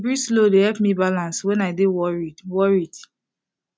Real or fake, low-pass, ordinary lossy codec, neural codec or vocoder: real; none; none; none